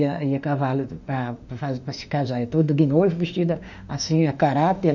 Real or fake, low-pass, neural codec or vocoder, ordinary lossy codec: fake; 7.2 kHz; autoencoder, 48 kHz, 32 numbers a frame, DAC-VAE, trained on Japanese speech; none